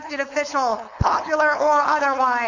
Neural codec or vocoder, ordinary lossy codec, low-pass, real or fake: codec, 16 kHz, 4.8 kbps, FACodec; MP3, 48 kbps; 7.2 kHz; fake